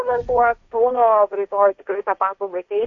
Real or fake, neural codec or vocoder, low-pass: fake; codec, 16 kHz, 1.1 kbps, Voila-Tokenizer; 7.2 kHz